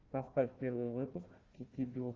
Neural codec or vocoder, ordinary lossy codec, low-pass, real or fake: codec, 16 kHz, 1 kbps, FunCodec, trained on Chinese and English, 50 frames a second; Opus, 32 kbps; 7.2 kHz; fake